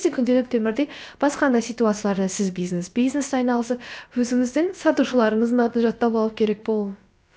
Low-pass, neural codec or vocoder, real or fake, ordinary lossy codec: none; codec, 16 kHz, about 1 kbps, DyCAST, with the encoder's durations; fake; none